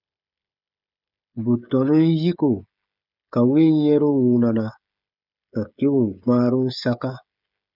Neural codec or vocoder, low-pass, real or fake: codec, 16 kHz, 16 kbps, FreqCodec, smaller model; 5.4 kHz; fake